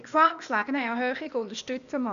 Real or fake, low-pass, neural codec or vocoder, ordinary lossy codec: fake; 7.2 kHz; codec, 16 kHz, 0.8 kbps, ZipCodec; none